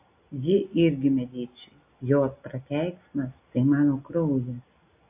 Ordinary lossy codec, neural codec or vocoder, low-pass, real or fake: AAC, 32 kbps; none; 3.6 kHz; real